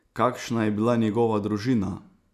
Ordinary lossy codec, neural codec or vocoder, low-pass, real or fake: none; none; 14.4 kHz; real